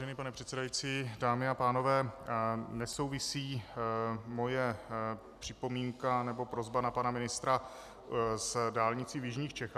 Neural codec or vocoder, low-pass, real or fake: none; 14.4 kHz; real